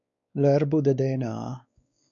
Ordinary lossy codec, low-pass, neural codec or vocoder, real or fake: MP3, 64 kbps; 7.2 kHz; codec, 16 kHz, 4 kbps, X-Codec, WavLM features, trained on Multilingual LibriSpeech; fake